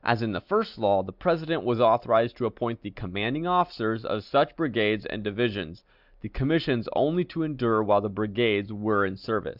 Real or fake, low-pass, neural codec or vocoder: real; 5.4 kHz; none